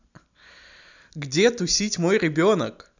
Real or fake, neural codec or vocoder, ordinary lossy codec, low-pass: real; none; none; 7.2 kHz